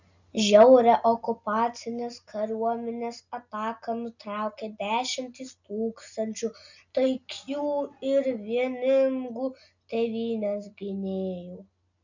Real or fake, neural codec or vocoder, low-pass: real; none; 7.2 kHz